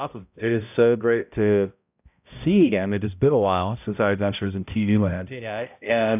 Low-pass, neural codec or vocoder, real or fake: 3.6 kHz; codec, 16 kHz, 0.5 kbps, X-Codec, HuBERT features, trained on balanced general audio; fake